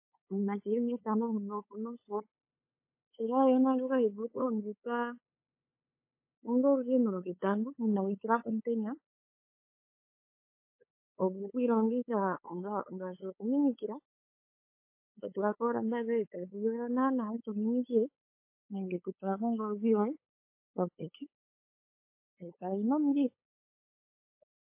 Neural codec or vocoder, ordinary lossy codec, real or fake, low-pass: codec, 16 kHz, 8 kbps, FunCodec, trained on LibriTTS, 25 frames a second; MP3, 24 kbps; fake; 3.6 kHz